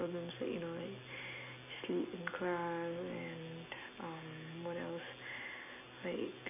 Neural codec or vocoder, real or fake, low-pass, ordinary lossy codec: none; real; 3.6 kHz; none